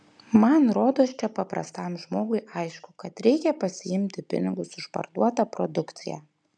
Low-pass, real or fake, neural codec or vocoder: 9.9 kHz; real; none